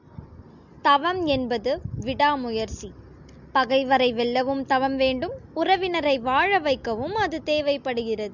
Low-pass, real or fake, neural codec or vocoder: 7.2 kHz; real; none